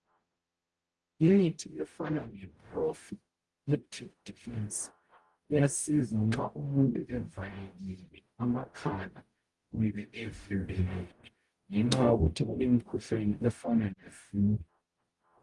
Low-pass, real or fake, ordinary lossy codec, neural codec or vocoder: 10.8 kHz; fake; Opus, 24 kbps; codec, 44.1 kHz, 0.9 kbps, DAC